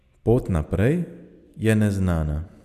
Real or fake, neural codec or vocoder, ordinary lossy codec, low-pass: real; none; none; 14.4 kHz